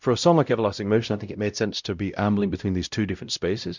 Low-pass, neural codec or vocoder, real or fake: 7.2 kHz; codec, 16 kHz, 0.5 kbps, X-Codec, WavLM features, trained on Multilingual LibriSpeech; fake